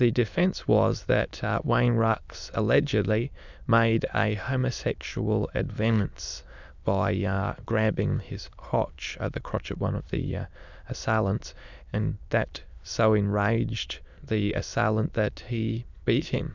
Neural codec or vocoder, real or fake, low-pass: autoencoder, 22.05 kHz, a latent of 192 numbers a frame, VITS, trained on many speakers; fake; 7.2 kHz